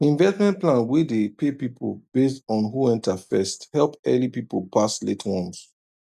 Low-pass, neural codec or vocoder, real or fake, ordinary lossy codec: 14.4 kHz; none; real; none